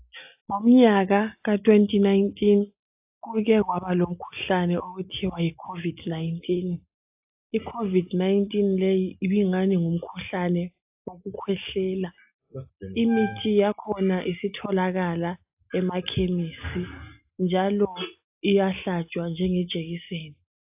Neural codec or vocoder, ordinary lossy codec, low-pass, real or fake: none; AAC, 32 kbps; 3.6 kHz; real